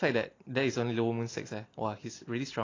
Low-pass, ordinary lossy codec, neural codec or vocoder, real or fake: 7.2 kHz; AAC, 32 kbps; none; real